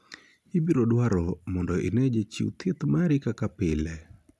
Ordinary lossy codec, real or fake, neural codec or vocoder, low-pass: none; real; none; none